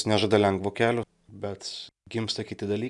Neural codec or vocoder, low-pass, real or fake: none; 10.8 kHz; real